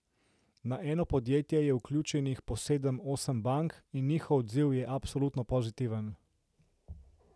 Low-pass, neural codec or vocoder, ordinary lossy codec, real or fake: none; none; none; real